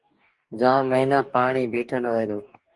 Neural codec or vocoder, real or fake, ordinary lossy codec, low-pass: codec, 44.1 kHz, 2.6 kbps, DAC; fake; Opus, 32 kbps; 10.8 kHz